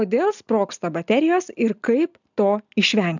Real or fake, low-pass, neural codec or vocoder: real; 7.2 kHz; none